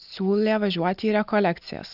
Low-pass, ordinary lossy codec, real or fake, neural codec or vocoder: 5.4 kHz; AAC, 48 kbps; real; none